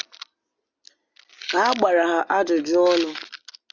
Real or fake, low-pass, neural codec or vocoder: real; 7.2 kHz; none